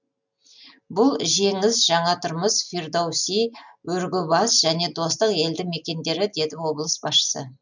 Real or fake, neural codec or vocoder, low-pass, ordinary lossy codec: real; none; 7.2 kHz; none